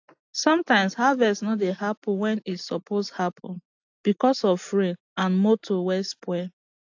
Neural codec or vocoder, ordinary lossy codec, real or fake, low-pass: none; AAC, 48 kbps; real; 7.2 kHz